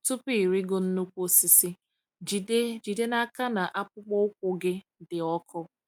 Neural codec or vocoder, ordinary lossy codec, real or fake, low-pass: none; none; real; none